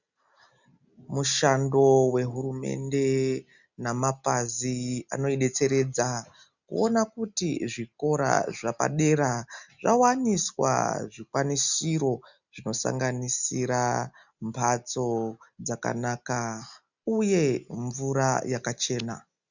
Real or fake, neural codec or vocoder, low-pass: real; none; 7.2 kHz